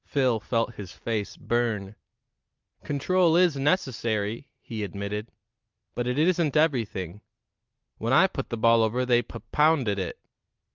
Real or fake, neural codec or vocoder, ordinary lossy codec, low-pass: real; none; Opus, 24 kbps; 7.2 kHz